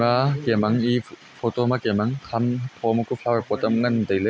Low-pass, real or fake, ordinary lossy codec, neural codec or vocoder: none; real; none; none